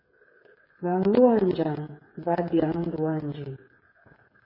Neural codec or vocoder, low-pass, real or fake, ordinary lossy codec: codec, 16 kHz, 8 kbps, FreqCodec, smaller model; 5.4 kHz; fake; MP3, 24 kbps